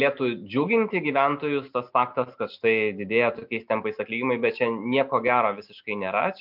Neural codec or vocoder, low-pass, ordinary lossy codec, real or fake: none; 5.4 kHz; MP3, 48 kbps; real